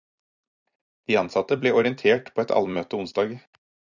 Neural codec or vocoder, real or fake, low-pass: vocoder, 44.1 kHz, 128 mel bands every 256 samples, BigVGAN v2; fake; 7.2 kHz